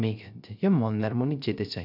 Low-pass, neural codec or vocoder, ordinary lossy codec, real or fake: 5.4 kHz; codec, 16 kHz, 0.3 kbps, FocalCodec; none; fake